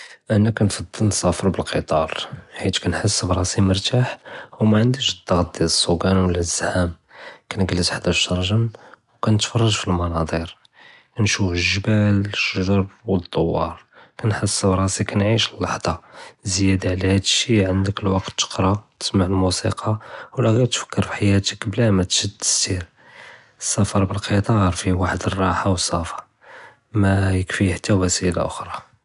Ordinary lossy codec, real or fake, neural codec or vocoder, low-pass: none; real; none; 10.8 kHz